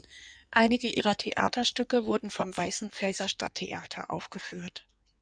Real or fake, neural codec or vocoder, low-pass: fake; codec, 16 kHz in and 24 kHz out, 1.1 kbps, FireRedTTS-2 codec; 9.9 kHz